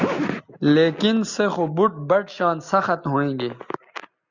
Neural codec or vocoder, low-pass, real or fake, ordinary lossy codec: none; 7.2 kHz; real; Opus, 64 kbps